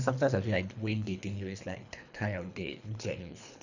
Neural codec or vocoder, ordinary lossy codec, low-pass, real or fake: codec, 24 kHz, 3 kbps, HILCodec; none; 7.2 kHz; fake